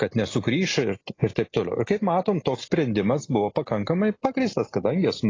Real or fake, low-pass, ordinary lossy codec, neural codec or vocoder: real; 7.2 kHz; AAC, 32 kbps; none